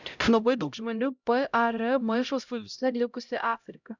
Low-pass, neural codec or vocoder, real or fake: 7.2 kHz; codec, 16 kHz, 0.5 kbps, X-Codec, HuBERT features, trained on LibriSpeech; fake